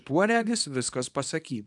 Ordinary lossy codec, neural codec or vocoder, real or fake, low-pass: MP3, 96 kbps; codec, 24 kHz, 0.9 kbps, WavTokenizer, small release; fake; 10.8 kHz